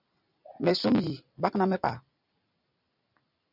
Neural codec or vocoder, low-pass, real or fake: vocoder, 44.1 kHz, 128 mel bands every 256 samples, BigVGAN v2; 5.4 kHz; fake